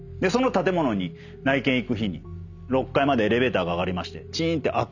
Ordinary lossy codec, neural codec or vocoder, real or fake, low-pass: none; none; real; 7.2 kHz